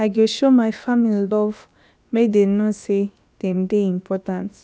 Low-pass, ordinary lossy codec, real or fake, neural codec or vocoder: none; none; fake; codec, 16 kHz, about 1 kbps, DyCAST, with the encoder's durations